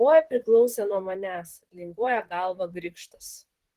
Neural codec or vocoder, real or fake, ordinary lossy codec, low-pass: vocoder, 44.1 kHz, 128 mel bands, Pupu-Vocoder; fake; Opus, 16 kbps; 14.4 kHz